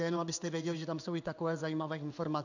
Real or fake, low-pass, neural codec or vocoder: fake; 7.2 kHz; codec, 16 kHz in and 24 kHz out, 1 kbps, XY-Tokenizer